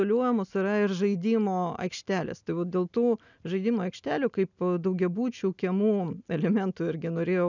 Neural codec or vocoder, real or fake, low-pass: none; real; 7.2 kHz